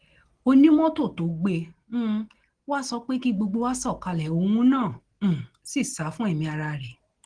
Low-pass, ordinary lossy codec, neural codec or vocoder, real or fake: 9.9 kHz; Opus, 16 kbps; none; real